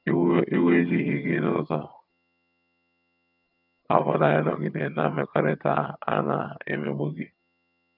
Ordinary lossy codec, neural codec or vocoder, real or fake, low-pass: none; vocoder, 22.05 kHz, 80 mel bands, HiFi-GAN; fake; 5.4 kHz